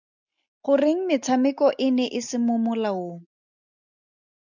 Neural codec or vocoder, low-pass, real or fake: none; 7.2 kHz; real